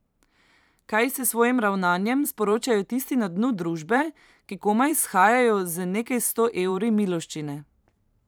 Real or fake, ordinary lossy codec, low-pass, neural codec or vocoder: fake; none; none; vocoder, 44.1 kHz, 128 mel bands every 512 samples, BigVGAN v2